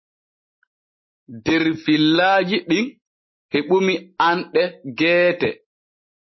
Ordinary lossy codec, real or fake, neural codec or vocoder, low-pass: MP3, 24 kbps; real; none; 7.2 kHz